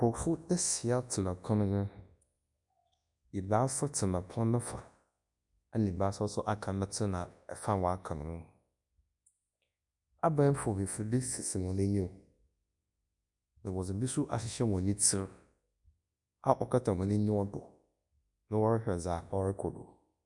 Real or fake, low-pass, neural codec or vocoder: fake; 10.8 kHz; codec, 24 kHz, 0.9 kbps, WavTokenizer, large speech release